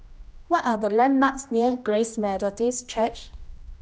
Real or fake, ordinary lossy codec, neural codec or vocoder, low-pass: fake; none; codec, 16 kHz, 1 kbps, X-Codec, HuBERT features, trained on general audio; none